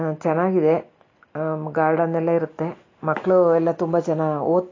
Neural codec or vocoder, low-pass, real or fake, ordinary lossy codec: none; 7.2 kHz; real; AAC, 32 kbps